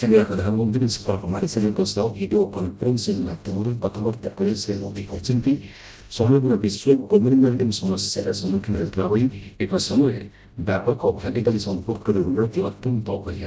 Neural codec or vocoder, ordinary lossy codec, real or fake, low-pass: codec, 16 kHz, 0.5 kbps, FreqCodec, smaller model; none; fake; none